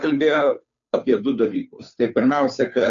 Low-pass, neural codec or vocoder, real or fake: 7.2 kHz; codec, 16 kHz, 2 kbps, FunCodec, trained on Chinese and English, 25 frames a second; fake